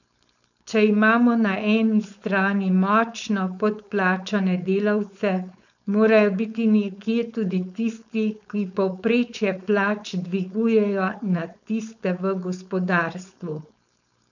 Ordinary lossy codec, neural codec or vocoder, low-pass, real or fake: none; codec, 16 kHz, 4.8 kbps, FACodec; 7.2 kHz; fake